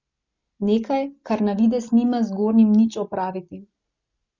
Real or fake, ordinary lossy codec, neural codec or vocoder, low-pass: real; Opus, 64 kbps; none; 7.2 kHz